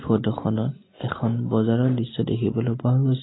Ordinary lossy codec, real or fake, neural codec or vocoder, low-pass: AAC, 16 kbps; real; none; 7.2 kHz